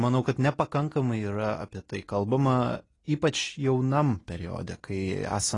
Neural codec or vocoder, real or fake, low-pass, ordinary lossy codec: none; real; 10.8 kHz; AAC, 32 kbps